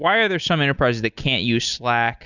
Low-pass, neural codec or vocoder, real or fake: 7.2 kHz; none; real